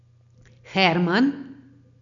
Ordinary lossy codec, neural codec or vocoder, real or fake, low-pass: none; none; real; 7.2 kHz